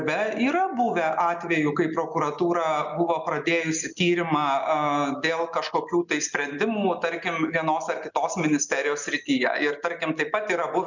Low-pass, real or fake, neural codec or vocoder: 7.2 kHz; real; none